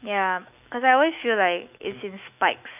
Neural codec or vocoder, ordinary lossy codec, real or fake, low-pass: autoencoder, 48 kHz, 128 numbers a frame, DAC-VAE, trained on Japanese speech; none; fake; 3.6 kHz